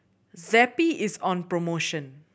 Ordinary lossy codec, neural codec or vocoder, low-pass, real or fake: none; none; none; real